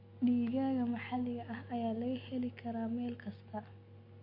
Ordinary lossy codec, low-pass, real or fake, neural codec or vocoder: MP3, 32 kbps; 5.4 kHz; real; none